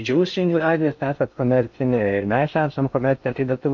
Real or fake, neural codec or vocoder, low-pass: fake; codec, 16 kHz in and 24 kHz out, 0.6 kbps, FocalCodec, streaming, 2048 codes; 7.2 kHz